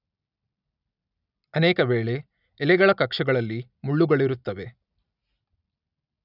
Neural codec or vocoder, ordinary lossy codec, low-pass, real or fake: none; none; 5.4 kHz; real